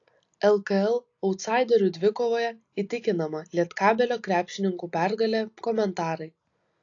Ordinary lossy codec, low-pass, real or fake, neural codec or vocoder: AAC, 48 kbps; 7.2 kHz; real; none